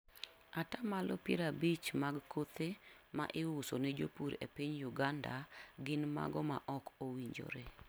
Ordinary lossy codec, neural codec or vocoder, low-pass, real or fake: none; none; none; real